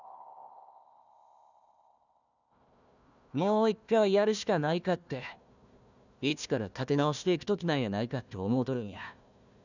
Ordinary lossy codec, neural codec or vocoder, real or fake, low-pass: none; codec, 16 kHz, 1 kbps, FunCodec, trained on Chinese and English, 50 frames a second; fake; 7.2 kHz